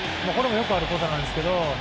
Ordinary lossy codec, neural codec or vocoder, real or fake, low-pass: none; none; real; none